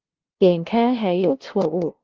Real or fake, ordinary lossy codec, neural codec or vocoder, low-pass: fake; Opus, 16 kbps; codec, 16 kHz, 0.5 kbps, FunCodec, trained on LibriTTS, 25 frames a second; 7.2 kHz